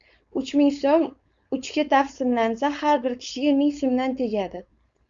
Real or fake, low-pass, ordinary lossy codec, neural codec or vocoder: fake; 7.2 kHz; Opus, 64 kbps; codec, 16 kHz, 4.8 kbps, FACodec